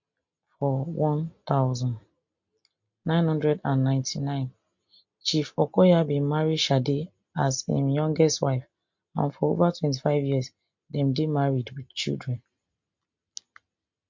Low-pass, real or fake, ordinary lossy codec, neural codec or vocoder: 7.2 kHz; real; MP3, 48 kbps; none